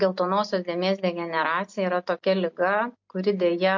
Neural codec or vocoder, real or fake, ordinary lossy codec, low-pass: none; real; MP3, 48 kbps; 7.2 kHz